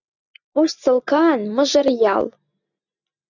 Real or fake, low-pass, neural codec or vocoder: real; 7.2 kHz; none